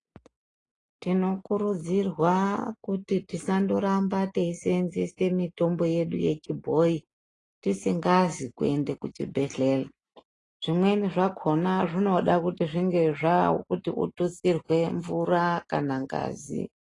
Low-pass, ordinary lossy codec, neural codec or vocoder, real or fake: 10.8 kHz; AAC, 32 kbps; none; real